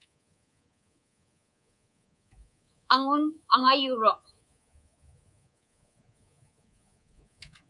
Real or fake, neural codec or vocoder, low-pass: fake; codec, 24 kHz, 3.1 kbps, DualCodec; 10.8 kHz